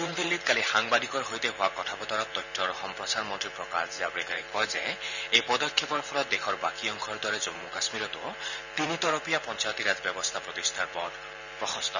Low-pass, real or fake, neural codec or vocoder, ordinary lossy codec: 7.2 kHz; fake; vocoder, 44.1 kHz, 128 mel bands every 512 samples, BigVGAN v2; none